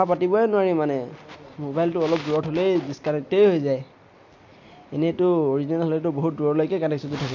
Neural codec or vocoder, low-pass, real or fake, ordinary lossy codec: none; 7.2 kHz; real; MP3, 48 kbps